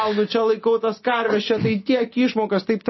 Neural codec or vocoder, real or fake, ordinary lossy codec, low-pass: none; real; MP3, 24 kbps; 7.2 kHz